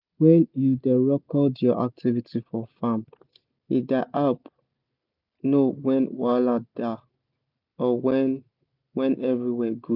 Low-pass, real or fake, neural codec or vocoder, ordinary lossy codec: 5.4 kHz; real; none; none